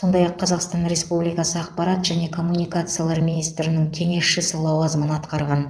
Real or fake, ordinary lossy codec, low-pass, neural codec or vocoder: fake; none; none; vocoder, 22.05 kHz, 80 mel bands, WaveNeXt